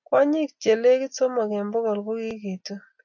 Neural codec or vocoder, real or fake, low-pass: none; real; 7.2 kHz